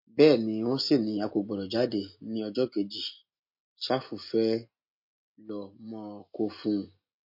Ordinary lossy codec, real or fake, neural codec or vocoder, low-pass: MP3, 32 kbps; real; none; 5.4 kHz